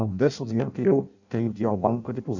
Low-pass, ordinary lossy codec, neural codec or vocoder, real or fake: 7.2 kHz; none; codec, 16 kHz in and 24 kHz out, 0.6 kbps, FireRedTTS-2 codec; fake